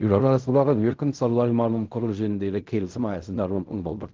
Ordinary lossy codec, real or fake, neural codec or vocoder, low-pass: Opus, 32 kbps; fake; codec, 16 kHz in and 24 kHz out, 0.4 kbps, LongCat-Audio-Codec, fine tuned four codebook decoder; 7.2 kHz